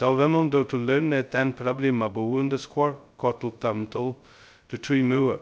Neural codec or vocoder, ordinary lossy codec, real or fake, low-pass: codec, 16 kHz, 0.2 kbps, FocalCodec; none; fake; none